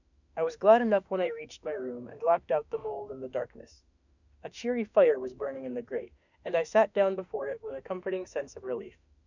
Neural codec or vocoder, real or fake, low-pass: autoencoder, 48 kHz, 32 numbers a frame, DAC-VAE, trained on Japanese speech; fake; 7.2 kHz